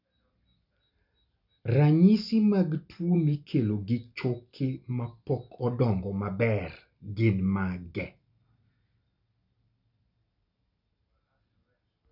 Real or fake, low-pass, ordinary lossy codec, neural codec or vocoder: real; 5.4 kHz; none; none